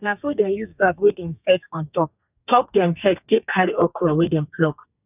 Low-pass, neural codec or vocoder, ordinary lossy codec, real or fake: 3.6 kHz; codec, 32 kHz, 1.9 kbps, SNAC; none; fake